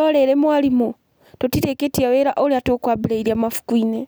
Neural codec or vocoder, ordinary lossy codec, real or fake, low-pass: none; none; real; none